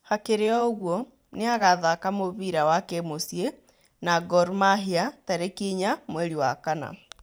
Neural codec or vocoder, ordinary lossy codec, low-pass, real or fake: vocoder, 44.1 kHz, 128 mel bands every 512 samples, BigVGAN v2; none; none; fake